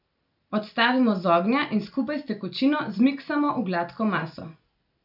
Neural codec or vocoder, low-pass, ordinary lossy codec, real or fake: none; 5.4 kHz; none; real